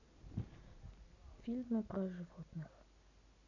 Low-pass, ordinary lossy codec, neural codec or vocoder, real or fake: 7.2 kHz; none; none; real